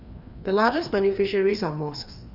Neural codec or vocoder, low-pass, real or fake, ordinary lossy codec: codec, 16 kHz, 2 kbps, FreqCodec, larger model; 5.4 kHz; fake; Opus, 64 kbps